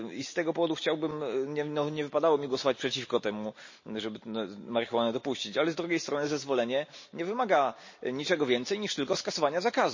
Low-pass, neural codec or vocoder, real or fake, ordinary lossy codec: 7.2 kHz; none; real; MP3, 32 kbps